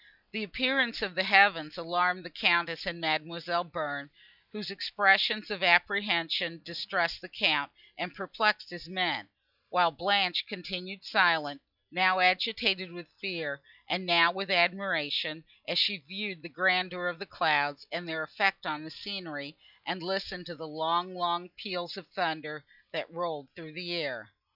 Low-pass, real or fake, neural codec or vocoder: 5.4 kHz; real; none